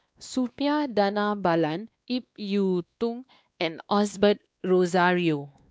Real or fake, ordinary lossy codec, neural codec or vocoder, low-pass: fake; none; codec, 16 kHz, 2 kbps, X-Codec, WavLM features, trained on Multilingual LibriSpeech; none